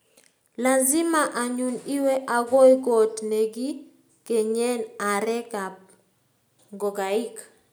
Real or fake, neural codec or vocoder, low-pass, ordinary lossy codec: real; none; none; none